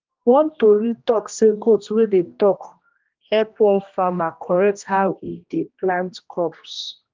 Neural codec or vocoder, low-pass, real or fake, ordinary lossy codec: codec, 16 kHz, 1 kbps, X-Codec, HuBERT features, trained on general audio; 7.2 kHz; fake; Opus, 24 kbps